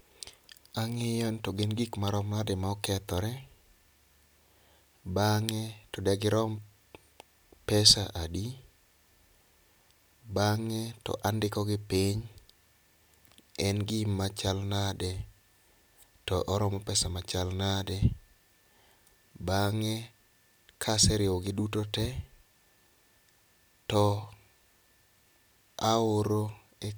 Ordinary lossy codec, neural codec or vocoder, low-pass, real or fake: none; none; none; real